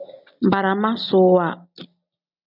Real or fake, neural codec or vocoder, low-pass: real; none; 5.4 kHz